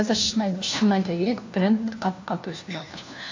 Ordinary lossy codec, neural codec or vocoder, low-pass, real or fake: AAC, 48 kbps; codec, 16 kHz, 1 kbps, FunCodec, trained on LibriTTS, 50 frames a second; 7.2 kHz; fake